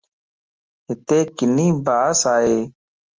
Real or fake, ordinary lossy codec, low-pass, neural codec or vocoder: real; Opus, 24 kbps; 7.2 kHz; none